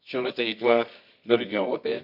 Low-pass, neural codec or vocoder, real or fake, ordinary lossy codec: 5.4 kHz; codec, 24 kHz, 0.9 kbps, WavTokenizer, medium music audio release; fake; none